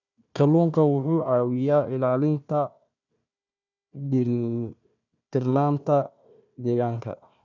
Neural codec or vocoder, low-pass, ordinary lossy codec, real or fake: codec, 16 kHz, 1 kbps, FunCodec, trained on Chinese and English, 50 frames a second; 7.2 kHz; none; fake